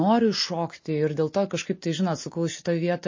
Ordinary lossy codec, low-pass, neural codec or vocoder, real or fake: MP3, 32 kbps; 7.2 kHz; none; real